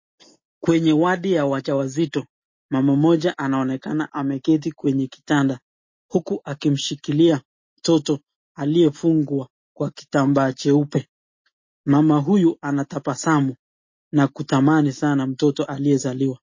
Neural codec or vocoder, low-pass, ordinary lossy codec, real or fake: none; 7.2 kHz; MP3, 32 kbps; real